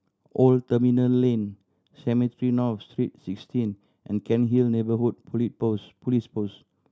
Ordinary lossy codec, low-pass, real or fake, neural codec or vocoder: none; none; real; none